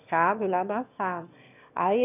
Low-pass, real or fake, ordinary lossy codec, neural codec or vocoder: 3.6 kHz; fake; none; autoencoder, 22.05 kHz, a latent of 192 numbers a frame, VITS, trained on one speaker